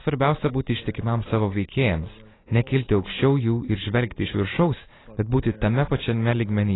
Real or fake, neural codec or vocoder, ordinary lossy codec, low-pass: real; none; AAC, 16 kbps; 7.2 kHz